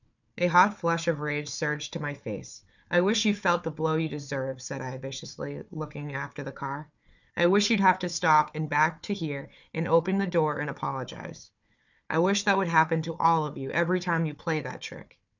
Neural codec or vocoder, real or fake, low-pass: codec, 16 kHz, 4 kbps, FunCodec, trained on Chinese and English, 50 frames a second; fake; 7.2 kHz